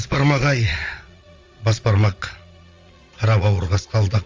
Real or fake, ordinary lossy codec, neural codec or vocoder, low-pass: fake; Opus, 32 kbps; vocoder, 22.05 kHz, 80 mel bands, Vocos; 7.2 kHz